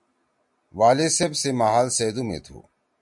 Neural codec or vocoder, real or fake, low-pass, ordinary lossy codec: none; real; 10.8 kHz; MP3, 64 kbps